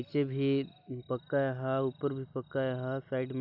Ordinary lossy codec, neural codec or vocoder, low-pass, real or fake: none; none; 5.4 kHz; real